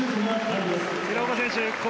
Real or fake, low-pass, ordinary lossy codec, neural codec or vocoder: real; none; none; none